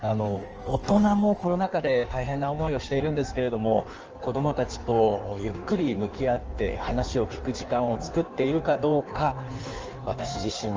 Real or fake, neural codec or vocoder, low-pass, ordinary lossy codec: fake; codec, 16 kHz in and 24 kHz out, 1.1 kbps, FireRedTTS-2 codec; 7.2 kHz; Opus, 24 kbps